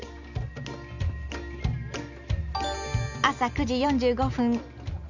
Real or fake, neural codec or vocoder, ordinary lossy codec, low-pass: real; none; none; 7.2 kHz